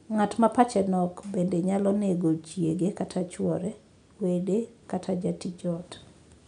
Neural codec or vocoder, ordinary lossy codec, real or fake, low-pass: none; none; real; 9.9 kHz